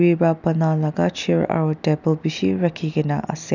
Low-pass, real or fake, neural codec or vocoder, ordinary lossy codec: 7.2 kHz; real; none; none